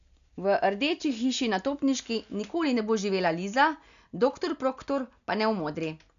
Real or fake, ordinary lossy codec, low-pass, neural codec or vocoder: real; none; 7.2 kHz; none